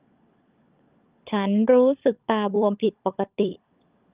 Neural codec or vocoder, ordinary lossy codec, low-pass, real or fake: codec, 16 kHz, 16 kbps, FunCodec, trained on LibriTTS, 50 frames a second; Opus, 24 kbps; 3.6 kHz; fake